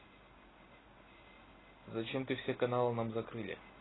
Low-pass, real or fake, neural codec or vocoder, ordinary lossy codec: 7.2 kHz; real; none; AAC, 16 kbps